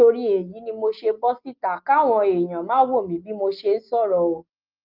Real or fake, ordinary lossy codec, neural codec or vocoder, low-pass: real; Opus, 24 kbps; none; 5.4 kHz